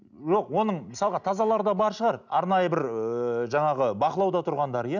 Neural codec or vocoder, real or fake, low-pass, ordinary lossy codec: none; real; 7.2 kHz; none